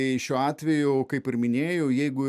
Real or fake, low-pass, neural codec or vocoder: real; 14.4 kHz; none